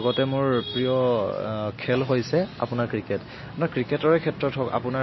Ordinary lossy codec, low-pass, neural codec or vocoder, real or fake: MP3, 24 kbps; 7.2 kHz; none; real